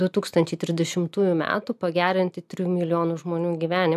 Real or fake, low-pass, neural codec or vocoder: real; 14.4 kHz; none